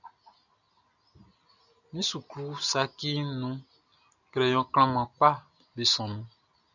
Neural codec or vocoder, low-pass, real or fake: none; 7.2 kHz; real